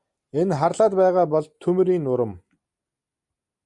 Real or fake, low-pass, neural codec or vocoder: real; 10.8 kHz; none